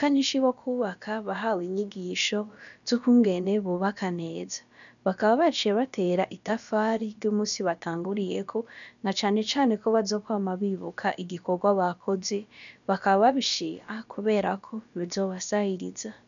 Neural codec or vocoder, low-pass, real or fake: codec, 16 kHz, about 1 kbps, DyCAST, with the encoder's durations; 7.2 kHz; fake